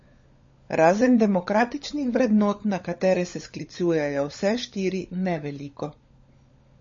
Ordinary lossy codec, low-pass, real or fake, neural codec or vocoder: MP3, 32 kbps; 7.2 kHz; fake; codec, 16 kHz, 16 kbps, FunCodec, trained on LibriTTS, 50 frames a second